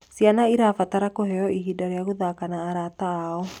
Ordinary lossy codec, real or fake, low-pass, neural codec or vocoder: none; real; 19.8 kHz; none